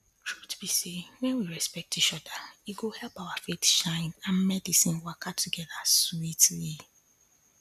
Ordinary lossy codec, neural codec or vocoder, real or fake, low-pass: none; none; real; 14.4 kHz